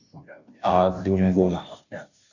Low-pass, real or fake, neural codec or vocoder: 7.2 kHz; fake; codec, 16 kHz, 0.5 kbps, FunCodec, trained on Chinese and English, 25 frames a second